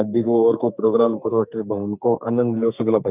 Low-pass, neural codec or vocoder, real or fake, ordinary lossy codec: 3.6 kHz; codec, 44.1 kHz, 2.6 kbps, SNAC; fake; none